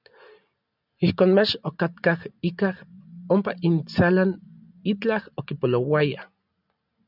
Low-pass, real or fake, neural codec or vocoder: 5.4 kHz; real; none